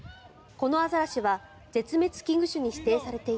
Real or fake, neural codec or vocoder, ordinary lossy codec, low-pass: real; none; none; none